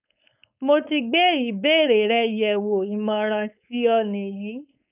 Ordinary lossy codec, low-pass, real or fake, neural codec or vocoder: none; 3.6 kHz; fake; codec, 16 kHz, 4.8 kbps, FACodec